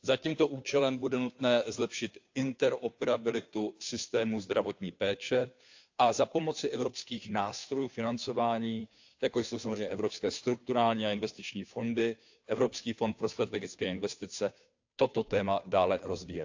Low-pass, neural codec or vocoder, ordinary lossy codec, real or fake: 7.2 kHz; codec, 16 kHz, 2 kbps, FunCodec, trained on Chinese and English, 25 frames a second; AAC, 48 kbps; fake